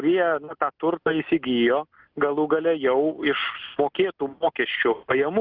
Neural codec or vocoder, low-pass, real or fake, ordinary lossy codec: none; 5.4 kHz; real; Opus, 32 kbps